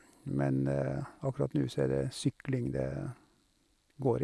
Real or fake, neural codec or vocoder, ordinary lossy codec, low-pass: real; none; none; none